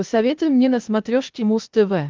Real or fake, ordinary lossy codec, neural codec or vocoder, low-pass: fake; Opus, 32 kbps; codec, 16 kHz, 0.8 kbps, ZipCodec; 7.2 kHz